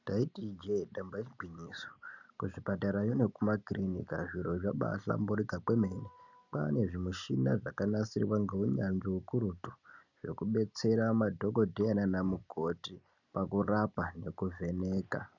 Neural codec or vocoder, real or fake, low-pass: none; real; 7.2 kHz